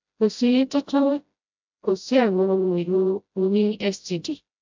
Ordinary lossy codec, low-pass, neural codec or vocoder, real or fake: MP3, 64 kbps; 7.2 kHz; codec, 16 kHz, 0.5 kbps, FreqCodec, smaller model; fake